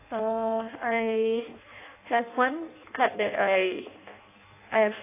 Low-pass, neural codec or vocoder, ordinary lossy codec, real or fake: 3.6 kHz; codec, 16 kHz in and 24 kHz out, 0.6 kbps, FireRedTTS-2 codec; AAC, 24 kbps; fake